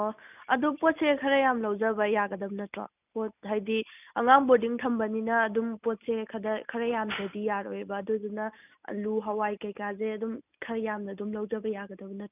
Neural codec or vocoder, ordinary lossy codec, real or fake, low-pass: none; none; real; 3.6 kHz